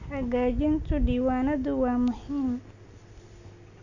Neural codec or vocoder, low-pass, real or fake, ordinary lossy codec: none; 7.2 kHz; real; none